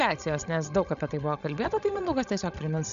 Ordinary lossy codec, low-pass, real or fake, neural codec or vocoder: AAC, 96 kbps; 7.2 kHz; fake; codec, 16 kHz, 16 kbps, FreqCodec, larger model